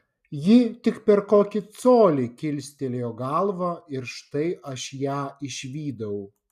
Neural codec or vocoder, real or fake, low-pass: none; real; 14.4 kHz